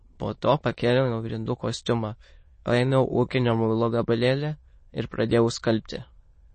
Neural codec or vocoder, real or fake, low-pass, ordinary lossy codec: autoencoder, 22.05 kHz, a latent of 192 numbers a frame, VITS, trained on many speakers; fake; 9.9 kHz; MP3, 32 kbps